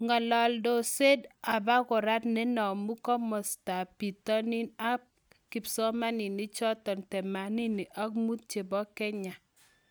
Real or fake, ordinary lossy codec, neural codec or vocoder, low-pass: real; none; none; none